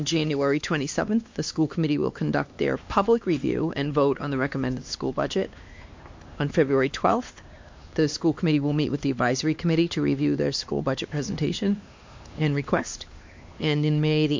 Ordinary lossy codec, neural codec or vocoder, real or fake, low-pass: MP3, 48 kbps; codec, 16 kHz, 2 kbps, X-Codec, HuBERT features, trained on LibriSpeech; fake; 7.2 kHz